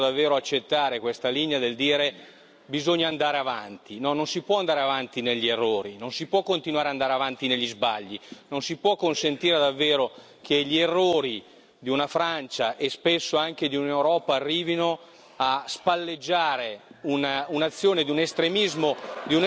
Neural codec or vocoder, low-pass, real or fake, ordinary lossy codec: none; none; real; none